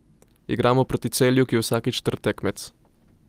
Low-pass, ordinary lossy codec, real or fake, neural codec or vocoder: 14.4 kHz; Opus, 32 kbps; real; none